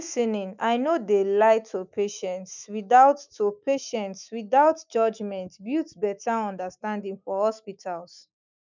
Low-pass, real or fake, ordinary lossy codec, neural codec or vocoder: 7.2 kHz; fake; none; autoencoder, 48 kHz, 128 numbers a frame, DAC-VAE, trained on Japanese speech